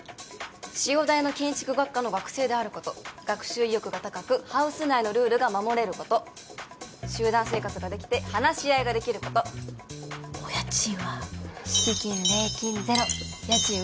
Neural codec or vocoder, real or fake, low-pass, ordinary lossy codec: none; real; none; none